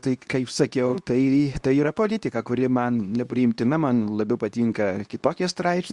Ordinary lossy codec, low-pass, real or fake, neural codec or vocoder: Opus, 64 kbps; 10.8 kHz; fake; codec, 24 kHz, 0.9 kbps, WavTokenizer, medium speech release version 2